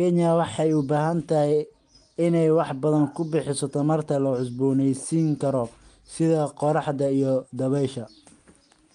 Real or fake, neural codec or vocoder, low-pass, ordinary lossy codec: real; none; 9.9 kHz; Opus, 32 kbps